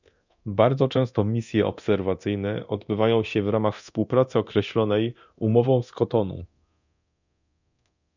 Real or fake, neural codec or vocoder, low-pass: fake; codec, 24 kHz, 0.9 kbps, DualCodec; 7.2 kHz